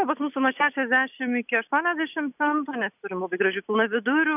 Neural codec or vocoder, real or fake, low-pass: none; real; 3.6 kHz